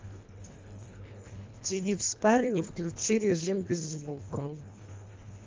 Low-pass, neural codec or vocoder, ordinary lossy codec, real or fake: 7.2 kHz; codec, 24 kHz, 1.5 kbps, HILCodec; Opus, 32 kbps; fake